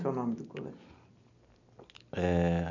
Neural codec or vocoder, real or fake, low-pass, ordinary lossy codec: none; real; 7.2 kHz; none